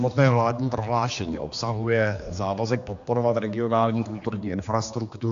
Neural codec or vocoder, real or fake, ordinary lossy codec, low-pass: codec, 16 kHz, 2 kbps, X-Codec, HuBERT features, trained on general audio; fake; AAC, 64 kbps; 7.2 kHz